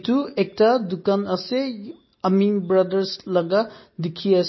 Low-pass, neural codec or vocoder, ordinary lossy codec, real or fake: 7.2 kHz; none; MP3, 24 kbps; real